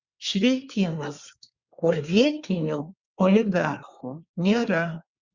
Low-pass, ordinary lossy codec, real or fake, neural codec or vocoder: 7.2 kHz; Opus, 64 kbps; fake; codec, 24 kHz, 1 kbps, SNAC